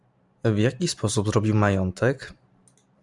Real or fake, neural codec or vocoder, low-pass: fake; vocoder, 44.1 kHz, 128 mel bands every 512 samples, BigVGAN v2; 10.8 kHz